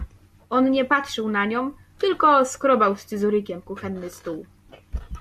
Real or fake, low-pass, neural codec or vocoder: real; 14.4 kHz; none